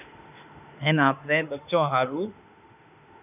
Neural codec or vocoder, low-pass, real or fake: autoencoder, 48 kHz, 32 numbers a frame, DAC-VAE, trained on Japanese speech; 3.6 kHz; fake